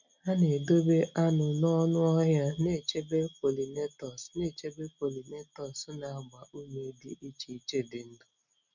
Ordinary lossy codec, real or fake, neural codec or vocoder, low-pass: none; real; none; 7.2 kHz